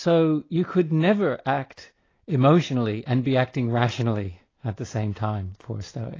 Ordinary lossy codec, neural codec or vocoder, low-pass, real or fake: AAC, 32 kbps; none; 7.2 kHz; real